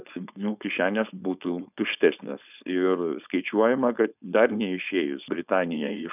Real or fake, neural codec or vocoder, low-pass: fake; codec, 16 kHz, 4.8 kbps, FACodec; 3.6 kHz